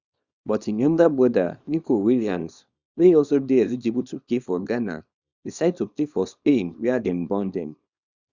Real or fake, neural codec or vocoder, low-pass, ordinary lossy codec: fake; codec, 24 kHz, 0.9 kbps, WavTokenizer, small release; 7.2 kHz; Opus, 64 kbps